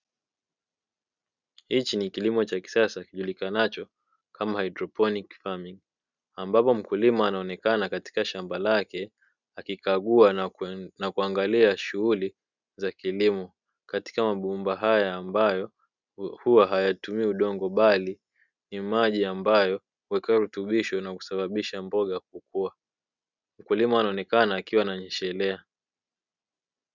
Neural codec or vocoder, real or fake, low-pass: none; real; 7.2 kHz